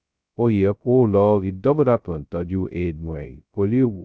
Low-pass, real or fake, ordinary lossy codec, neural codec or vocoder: none; fake; none; codec, 16 kHz, 0.2 kbps, FocalCodec